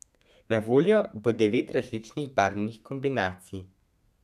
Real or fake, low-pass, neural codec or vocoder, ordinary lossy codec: fake; 14.4 kHz; codec, 32 kHz, 1.9 kbps, SNAC; none